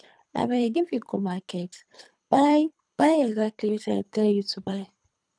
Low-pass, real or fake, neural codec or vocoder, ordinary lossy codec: 9.9 kHz; fake; codec, 24 kHz, 3 kbps, HILCodec; none